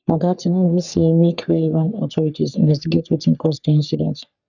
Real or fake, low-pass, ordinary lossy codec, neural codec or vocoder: fake; 7.2 kHz; none; codec, 44.1 kHz, 3.4 kbps, Pupu-Codec